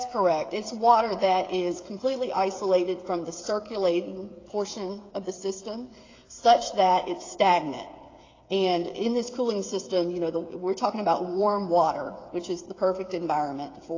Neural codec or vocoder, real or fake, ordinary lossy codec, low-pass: codec, 16 kHz, 8 kbps, FreqCodec, smaller model; fake; AAC, 32 kbps; 7.2 kHz